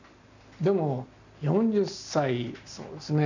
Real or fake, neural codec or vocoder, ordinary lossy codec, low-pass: real; none; none; 7.2 kHz